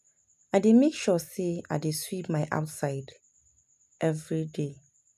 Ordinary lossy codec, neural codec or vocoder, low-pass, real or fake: none; none; 14.4 kHz; real